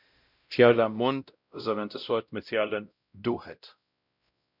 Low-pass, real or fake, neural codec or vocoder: 5.4 kHz; fake; codec, 16 kHz, 0.5 kbps, X-Codec, WavLM features, trained on Multilingual LibriSpeech